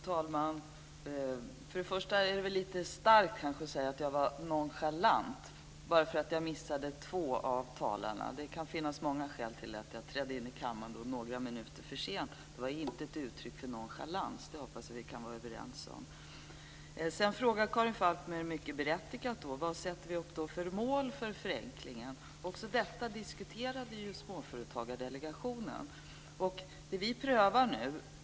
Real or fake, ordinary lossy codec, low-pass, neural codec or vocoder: real; none; none; none